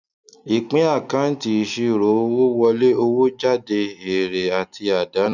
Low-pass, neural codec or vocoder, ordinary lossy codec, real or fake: 7.2 kHz; none; none; real